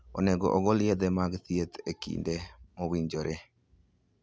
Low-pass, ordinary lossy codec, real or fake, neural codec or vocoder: none; none; real; none